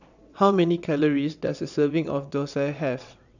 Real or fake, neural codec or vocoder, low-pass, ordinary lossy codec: fake; vocoder, 22.05 kHz, 80 mel bands, WaveNeXt; 7.2 kHz; none